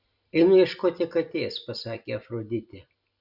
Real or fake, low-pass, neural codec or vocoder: real; 5.4 kHz; none